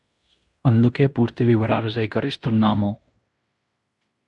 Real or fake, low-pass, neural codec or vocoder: fake; 10.8 kHz; codec, 16 kHz in and 24 kHz out, 0.9 kbps, LongCat-Audio-Codec, fine tuned four codebook decoder